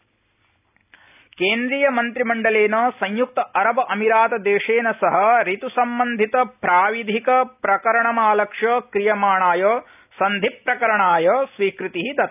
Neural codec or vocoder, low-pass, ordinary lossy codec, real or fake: none; 3.6 kHz; none; real